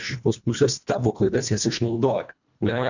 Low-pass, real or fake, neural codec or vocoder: 7.2 kHz; fake; codec, 24 kHz, 1.5 kbps, HILCodec